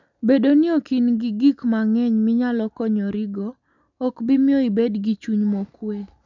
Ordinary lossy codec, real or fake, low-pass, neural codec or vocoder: none; real; 7.2 kHz; none